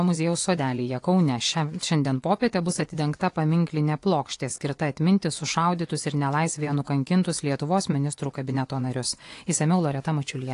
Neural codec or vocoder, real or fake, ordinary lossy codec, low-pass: vocoder, 24 kHz, 100 mel bands, Vocos; fake; AAC, 48 kbps; 10.8 kHz